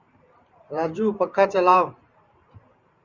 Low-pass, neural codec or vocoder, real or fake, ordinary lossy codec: 7.2 kHz; vocoder, 44.1 kHz, 128 mel bands every 512 samples, BigVGAN v2; fake; Opus, 64 kbps